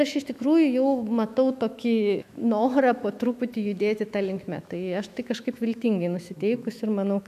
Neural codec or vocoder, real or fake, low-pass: autoencoder, 48 kHz, 128 numbers a frame, DAC-VAE, trained on Japanese speech; fake; 14.4 kHz